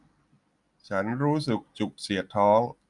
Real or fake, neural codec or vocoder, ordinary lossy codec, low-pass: real; none; none; 10.8 kHz